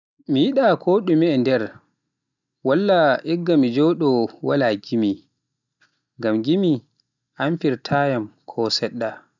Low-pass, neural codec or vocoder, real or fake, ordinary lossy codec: 7.2 kHz; none; real; none